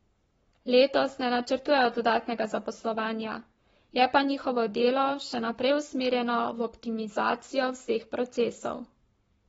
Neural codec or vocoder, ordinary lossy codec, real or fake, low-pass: codec, 44.1 kHz, 7.8 kbps, Pupu-Codec; AAC, 24 kbps; fake; 19.8 kHz